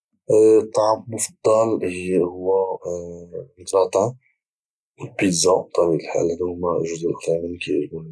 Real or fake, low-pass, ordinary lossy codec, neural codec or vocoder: real; none; none; none